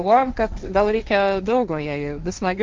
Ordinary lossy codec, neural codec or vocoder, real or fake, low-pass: Opus, 24 kbps; codec, 16 kHz, 1.1 kbps, Voila-Tokenizer; fake; 7.2 kHz